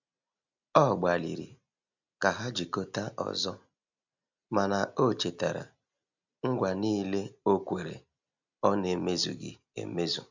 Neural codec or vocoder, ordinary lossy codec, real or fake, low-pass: none; none; real; 7.2 kHz